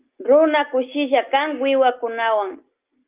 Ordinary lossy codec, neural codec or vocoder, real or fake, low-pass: Opus, 32 kbps; none; real; 3.6 kHz